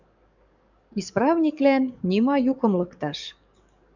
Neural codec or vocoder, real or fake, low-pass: codec, 44.1 kHz, 7.8 kbps, DAC; fake; 7.2 kHz